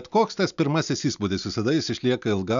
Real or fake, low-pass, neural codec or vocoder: real; 7.2 kHz; none